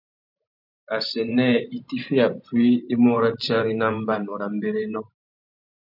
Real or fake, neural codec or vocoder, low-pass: fake; vocoder, 44.1 kHz, 128 mel bands every 512 samples, BigVGAN v2; 5.4 kHz